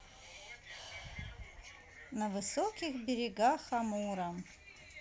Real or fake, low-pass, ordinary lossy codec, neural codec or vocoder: real; none; none; none